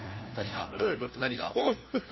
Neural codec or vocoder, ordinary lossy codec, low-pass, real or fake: codec, 16 kHz, 1 kbps, FunCodec, trained on LibriTTS, 50 frames a second; MP3, 24 kbps; 7.2 kHz; fake